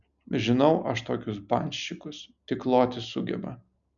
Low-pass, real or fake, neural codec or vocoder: 7.2 kHz; real; none